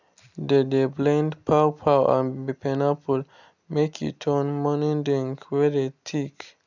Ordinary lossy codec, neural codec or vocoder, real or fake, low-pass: none; none; real; 7.2 kHz